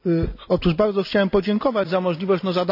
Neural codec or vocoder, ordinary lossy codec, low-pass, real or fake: none; none; 5.4 kHz; real